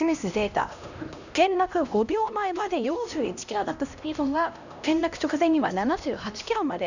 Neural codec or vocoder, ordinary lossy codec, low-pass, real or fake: codec, 16 kHz, 1 kbps, X-Codec, HuBERT features, trained on LibriSpeech; none; 7.2 kHz; fake